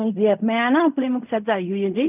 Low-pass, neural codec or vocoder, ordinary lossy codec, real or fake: 3.6 kHz; codec, 16 kHz in and 24 kHz out, 0.4 kbps, LongCat-Audio-Codec, fine tuned four codebook decoder; none; fake